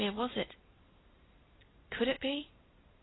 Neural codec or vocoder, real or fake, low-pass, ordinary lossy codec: none; real; 7.2 kHz; AAC, 16 kbps